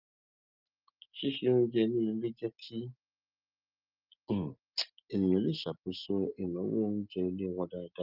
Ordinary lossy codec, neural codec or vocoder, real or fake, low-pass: Opus, 24 kbps; none; real; 5.4 kHz